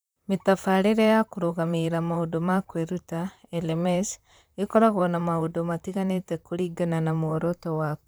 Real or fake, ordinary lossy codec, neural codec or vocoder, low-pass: fake; none; vocoder, 44.1 kHz, 128 mel bands, Pupu-Vocoder; none